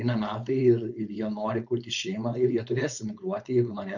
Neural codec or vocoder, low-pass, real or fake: codec, 16 kHz, 4.8 kbps, FACodec; 7.2 kHz; fake